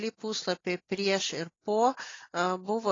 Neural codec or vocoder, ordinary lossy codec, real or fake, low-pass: none; AAC, 32 kbps; real; 7.2 kHz